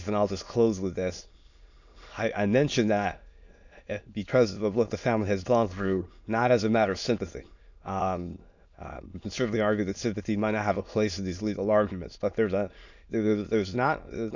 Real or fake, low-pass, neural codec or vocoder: fake; 7.2 kHz; autoencoder, 22.05 kHz, a latent of 192 numbers a frame, VITS, trained on many speakers